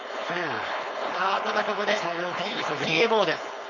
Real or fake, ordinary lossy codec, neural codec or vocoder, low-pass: fake; none; codec, 16 kHz, 4.8 kbps, FACodec; 7.2 kHz